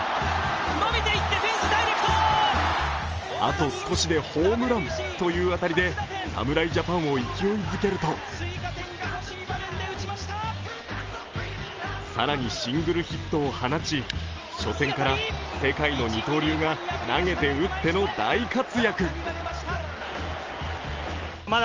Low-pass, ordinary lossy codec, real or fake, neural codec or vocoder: 7.2 kHz; Opus, 24 kbps; real; none